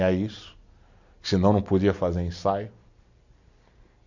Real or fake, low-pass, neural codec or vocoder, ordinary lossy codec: real; 7.2 kHz; none; none